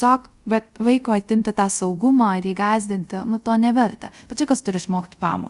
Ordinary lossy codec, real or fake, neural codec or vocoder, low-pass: Opus, 64 kbps; fake; codec, 24 kHz, 0.5 kbps, DualCodec; 10.8 kHz